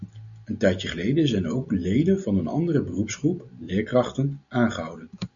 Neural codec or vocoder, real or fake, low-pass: none; real; 7.2 kHz